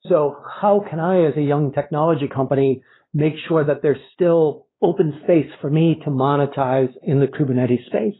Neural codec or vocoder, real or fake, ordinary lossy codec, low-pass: codec, 16 kHz, 2 kbps, X-Codec, WavLM features, trained on Multilingual LibriSpeech; fake; AAC, 16 kbps; 7.2 kHz